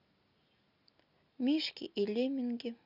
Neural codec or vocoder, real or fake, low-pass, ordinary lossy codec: none; real; 5.4 kHz; Opus, 64 kbps